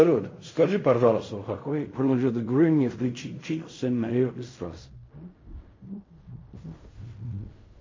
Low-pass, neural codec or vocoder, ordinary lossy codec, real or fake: 7.2 kHz; codec, 16 kHz in and 24 kHz out, 0.4 kbps, LongCat-Audio-Codec, fine tuned four codebook decoder; MP3, 32 kbps; fake